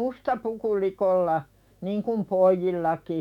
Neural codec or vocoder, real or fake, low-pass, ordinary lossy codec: autoencoder, 48 kHz, 128 numbers a frame, DAC-VAE, trained on Japanese speech; fake; 19.8 kHz; none